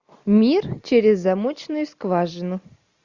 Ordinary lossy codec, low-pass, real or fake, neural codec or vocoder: Opus, 64 kbps; 7.2 kHz; real; none